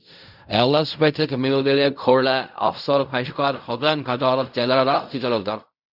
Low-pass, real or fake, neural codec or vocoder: 5.4 kHz; fake; codec, 16 kHz in and 24 kHz out, 0.4 kbps, LongCat-Audio-Codec, fine tuned four codebook decoder